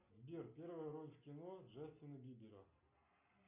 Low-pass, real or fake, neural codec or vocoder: 3.6 kHz; real; none